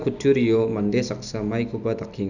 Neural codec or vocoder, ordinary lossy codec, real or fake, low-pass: none; none; real; 7.2 kHz